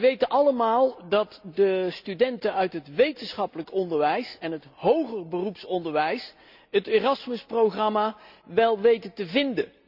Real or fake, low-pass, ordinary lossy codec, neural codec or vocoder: real; 5.4 kHz; none; none